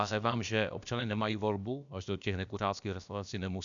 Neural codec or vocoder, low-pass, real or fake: codec, 16 kHz, about 1 kbps, DyCAST, with the encoder's durations; 7.2 kHz; fake